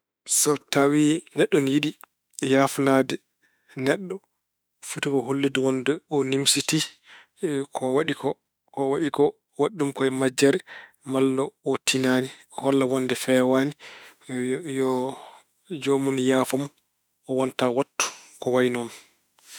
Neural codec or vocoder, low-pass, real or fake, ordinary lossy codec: autoencoder, 48 kHz, 32 numbers a frame, DAC-VAE, trained on Japanese speech; none; fake; none